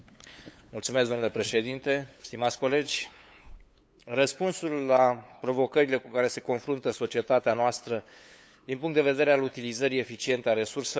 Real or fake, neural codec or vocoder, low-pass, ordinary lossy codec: fake; codec, 16 kHz, 8 kbps, FunCodec, trained on LibriTTS, 25 frames a second; none; none